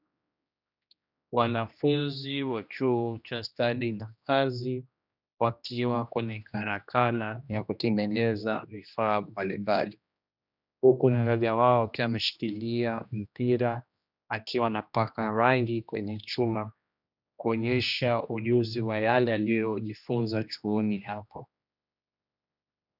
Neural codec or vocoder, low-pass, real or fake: codec, 16 kHz, 1 kbps, X-Codec, HuBERT features, trained on general audio; 5.4 kHz; fake